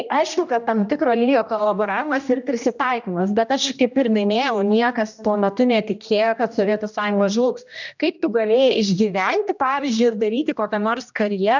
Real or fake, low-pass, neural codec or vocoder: fake; 7.2 kHz; codec, 16 kHz, 1 kbps, X-Codec, HuBERT features, trained on general audio